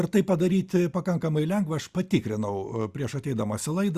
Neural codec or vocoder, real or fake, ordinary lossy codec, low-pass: none; real; Opus, 64 kbps; 14.4 kHz